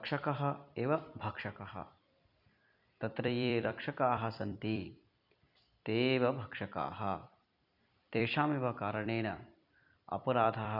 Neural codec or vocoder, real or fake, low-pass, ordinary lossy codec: vocoder, 44.1 kHz, 128 mel bands every 256 samples, BigVGAN v2; fake; 5.4 kHz; none